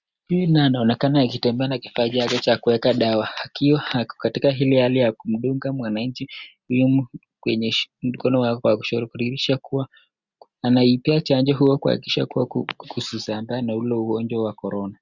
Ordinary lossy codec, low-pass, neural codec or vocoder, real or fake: Opus, 64 kbps; 7.2 kHz; none; real